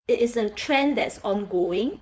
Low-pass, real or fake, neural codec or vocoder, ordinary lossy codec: none; fake; codec, 16 kHz, 4.8 kbps, FACodec; none